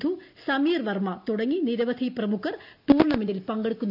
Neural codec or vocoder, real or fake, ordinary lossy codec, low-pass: none; real; none; 5.4 kHz